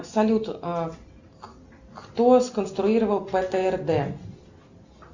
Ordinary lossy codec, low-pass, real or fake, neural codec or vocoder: Opus, 64 kbps; 7.2 kHz; real; none